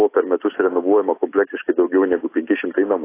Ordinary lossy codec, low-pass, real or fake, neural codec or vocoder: AAC, 24 kbps; 3.6 kHz; real; none